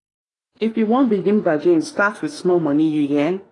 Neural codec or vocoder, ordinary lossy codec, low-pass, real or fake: autoencoder, 48 kHz, 32 numbers a frame, DAC-VAE, trained on Japanese speech; AAC, 32 kbps; 10.8 kHz; fake